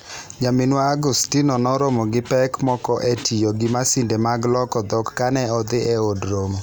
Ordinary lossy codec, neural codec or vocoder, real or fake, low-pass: none; none; real; none